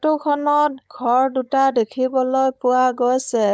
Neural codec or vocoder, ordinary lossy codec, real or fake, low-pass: codec, 16 kHz, 4.8 kbps, FACodec; none; fake; none